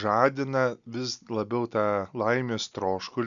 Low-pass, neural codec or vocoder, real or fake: 7.2 kHz; none; real